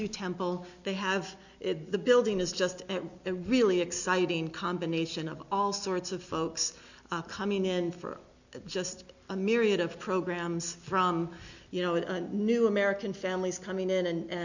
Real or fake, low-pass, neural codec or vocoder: real; 7.2 kHz; none